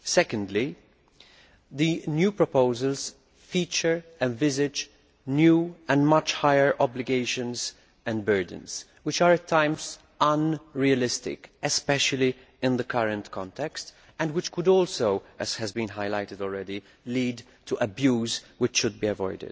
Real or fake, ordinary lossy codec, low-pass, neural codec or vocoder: real; none; none; none